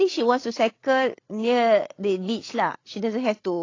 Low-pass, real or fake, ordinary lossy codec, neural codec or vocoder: 7.2 kHz; fake; AAC, 32 kbps; codec, 16 kHz, 8 kbps, FreqCodec, larger model